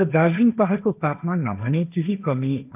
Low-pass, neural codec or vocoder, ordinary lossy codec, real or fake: 3.6 kHz; codec, 16 kHz, 1.1 kbps, Voila-Tokenizer; none; fake